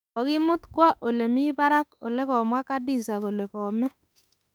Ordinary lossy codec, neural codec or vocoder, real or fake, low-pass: none; autoencoder, 48 kHz, 32 numbers a frame, DAC-VAE, trained on Japanese speech; fake; 19.8 kHz